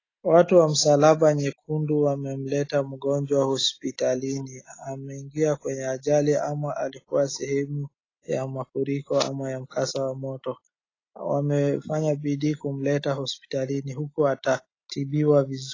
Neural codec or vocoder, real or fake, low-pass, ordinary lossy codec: none; real; 7.2 kHz; AAC, 32 kbps